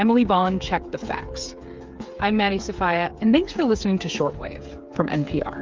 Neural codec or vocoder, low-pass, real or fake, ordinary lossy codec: codec, 16 kHz, 8 kbps, FreqCodec, smaller model; 7.2 kHz; fake; Opus, 24 kbps